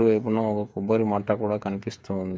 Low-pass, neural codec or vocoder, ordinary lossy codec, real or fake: none; codec, 16 kHz, 8 kbps, FreqCodec, smaller model; none; fake